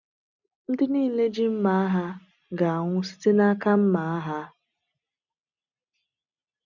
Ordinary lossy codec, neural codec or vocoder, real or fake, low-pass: none; none; real; 7.2 kHz